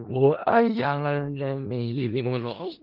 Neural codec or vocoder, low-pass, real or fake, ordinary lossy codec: codec, 16 kHz in and 24 kHz out, 0.4 kbps, LongCat-Audio-Codec, four codebook decoder; 5.4 kHz; fake; Opus, 32 kbps